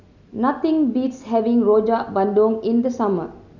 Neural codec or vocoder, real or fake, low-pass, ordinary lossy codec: none; real; 7.2 kHz; none